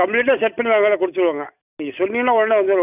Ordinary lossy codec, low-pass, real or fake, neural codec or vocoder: none; 3.6 kHz; real; none